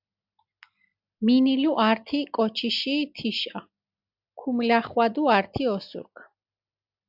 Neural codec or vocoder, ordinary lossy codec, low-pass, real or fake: none; AAC, 48 kbps; 5.4 kHz; real